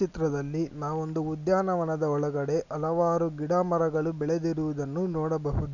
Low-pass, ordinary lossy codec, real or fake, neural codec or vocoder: 7.2 kHz; none; real; none